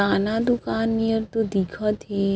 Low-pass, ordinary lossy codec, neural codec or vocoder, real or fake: none; none; none; real